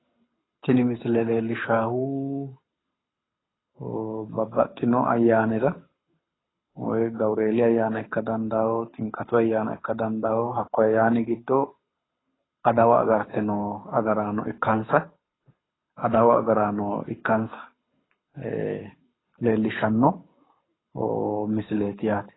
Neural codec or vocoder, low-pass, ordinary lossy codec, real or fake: codec, 24 kHz, 6 kbps, HILCodec; 7.2 kHz; AAC, 16 kbps; fake